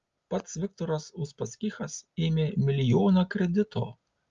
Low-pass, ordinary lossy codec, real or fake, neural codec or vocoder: 7.2 kHz; Opus, 32 kbps; real; none